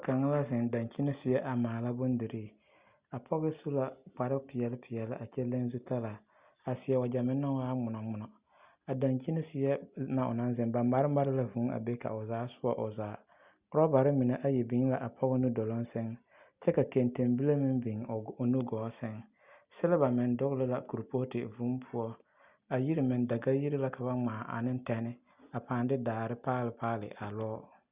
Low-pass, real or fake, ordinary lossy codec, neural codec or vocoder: 3.6 kHz; real; Opus, 64 kbps; none